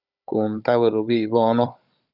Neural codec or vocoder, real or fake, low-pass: codec, 16 kHz, 16 kbps, FunCodec, trained on Chinese and English, 50 frames a second; fake; 5.4 kHz